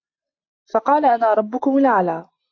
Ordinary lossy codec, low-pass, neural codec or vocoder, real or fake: AAC, 48 kbps; 7.2 kHz; none; real